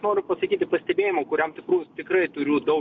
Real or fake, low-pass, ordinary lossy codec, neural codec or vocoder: real; 7.2 kHz; MP3, 64 kbps; none